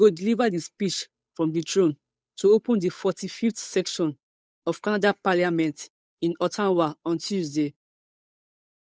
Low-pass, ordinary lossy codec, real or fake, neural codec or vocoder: none; none; fake; codec, 16 kHz, 8 kbps, FunCodec, trained on Chinese and English, 25 frames a second